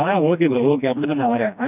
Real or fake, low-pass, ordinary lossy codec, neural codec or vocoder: fake; 3.6 kHz; none; codec, 16 kHz, 1 kbps, FreqCodec, smaller model